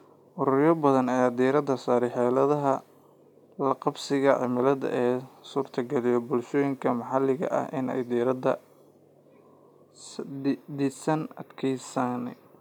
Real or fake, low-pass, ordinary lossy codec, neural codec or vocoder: real; 19.8 kHz; none; none